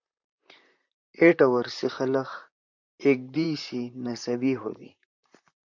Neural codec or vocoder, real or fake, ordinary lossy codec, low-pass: vocoder, 44.1 kHz, 128 mel bands, Pupu-Vocoder; fake; MP3, 64 kbps; 7.2 kHz